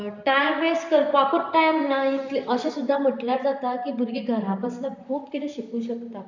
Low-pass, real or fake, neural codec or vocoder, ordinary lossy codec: 7.2 kHz; fake; vocoder, 44.1 kHz, 128 mel bands, Pupu-Vocoder; none